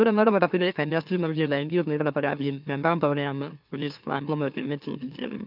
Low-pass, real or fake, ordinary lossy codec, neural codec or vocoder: 5.4 kHz; fake; none; autoencoder, 44.1 kHz, a latent of 192 numbers a frame, MeloTTS